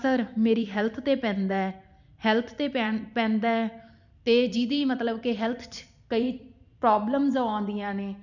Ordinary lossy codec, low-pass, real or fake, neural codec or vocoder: none; 7.2 kHz; fake; vocoder, 44.1 kHz, 128 mel bands every 512 samples, BigVGAN v2